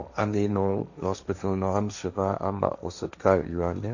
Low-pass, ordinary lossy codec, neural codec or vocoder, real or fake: 7.2 kHz; none; codec, 16 kHz, 1.1 kbps, Voila-Tokenizer; fake